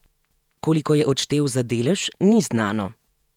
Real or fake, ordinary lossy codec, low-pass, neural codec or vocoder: fake; none; 19.8 kHz; vocoder, 48 kHz, 128 mel bands, Vocos